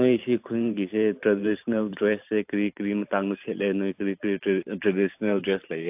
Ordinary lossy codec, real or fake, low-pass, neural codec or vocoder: AAC, 32 kbps; fake; 3.6 kHz; vocoder, 44.1 kHz, 128 mel bands every 512 samples, BigVGAN v2